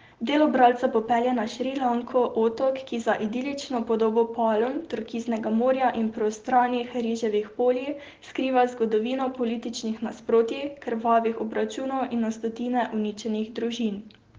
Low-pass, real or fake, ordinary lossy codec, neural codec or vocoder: 7.2 kHz; real; Opus, 16 kbps; none